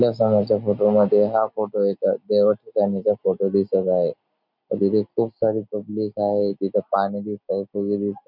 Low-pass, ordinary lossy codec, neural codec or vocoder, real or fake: 5.4 kHz; none; none; real